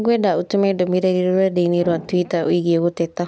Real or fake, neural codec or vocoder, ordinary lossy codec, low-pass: real; none; none; none